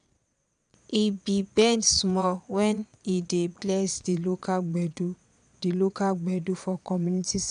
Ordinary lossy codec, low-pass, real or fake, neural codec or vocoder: none; 9.9 kHz; fake; vocoder, 22.05 kHz, 80 mel bands, Vocos